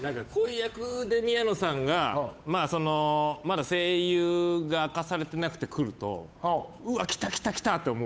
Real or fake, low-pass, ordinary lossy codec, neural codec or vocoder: fake; none; none; codec, 16 kHz, 8 kbps, FunCodec, trained on Chinese and English, 25 frames a second